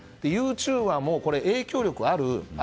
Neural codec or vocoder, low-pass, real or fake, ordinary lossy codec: none; none; real; none